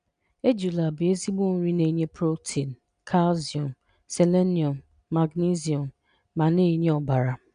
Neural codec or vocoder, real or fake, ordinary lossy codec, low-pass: none; real; none; 9.9 kHz